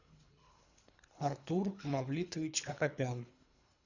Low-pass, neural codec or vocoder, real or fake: 7.2 kHz; codec, 24 kHz, 3 kbps, HILCodec; fake